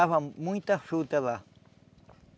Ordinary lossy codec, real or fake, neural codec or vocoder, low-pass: none; real; none; none